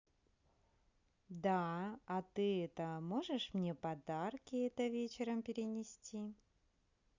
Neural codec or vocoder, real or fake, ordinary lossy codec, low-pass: none; real; Opus, 64 kbps; 7.2 kHz